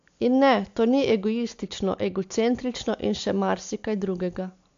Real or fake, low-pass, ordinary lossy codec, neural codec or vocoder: real; 7.2 kHz; AAC, 64 kbps; none